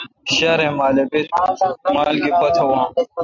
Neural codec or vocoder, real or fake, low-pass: none; real; 7.2 kHz